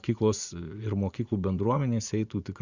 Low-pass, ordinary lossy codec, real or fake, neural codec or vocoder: 7.2 kHz; Opus, 64 kbps; fake; vocoder, 44.1 kHz, 80 mel bands, Vocos